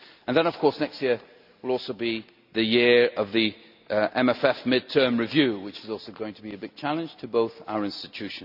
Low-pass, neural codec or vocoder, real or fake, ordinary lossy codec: 5.4 kHz; none; real; none